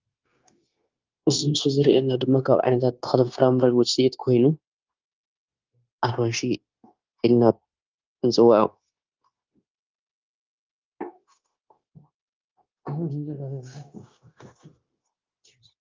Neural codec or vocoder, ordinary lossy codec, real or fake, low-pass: codec, 16 kHz, 0.9 kbps, LongCat-Audio-Codec; Opus, 24 kbps; fake; 7.2 kHz